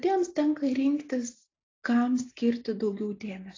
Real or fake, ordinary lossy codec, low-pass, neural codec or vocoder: real; AAC, 32 kbps; 7.2 kHz; none